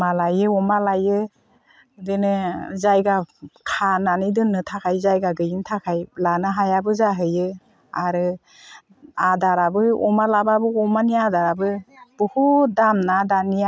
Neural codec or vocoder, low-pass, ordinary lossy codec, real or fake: none; none; none; real